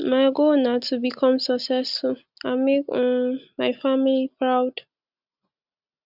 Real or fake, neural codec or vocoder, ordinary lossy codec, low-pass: real; none; Opus, 64 kbps; 5.4 kHz